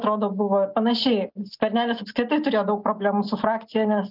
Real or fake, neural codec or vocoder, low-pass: real; none; 5.4 kHz